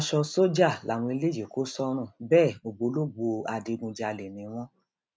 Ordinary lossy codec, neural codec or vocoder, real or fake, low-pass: none; none; real; none